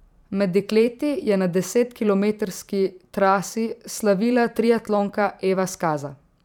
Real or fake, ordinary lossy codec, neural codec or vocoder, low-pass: fake; none; vocoder, 44.1 kHz, 128 mel bands every 512 samples, BigVGAN v2; 19.8 kHz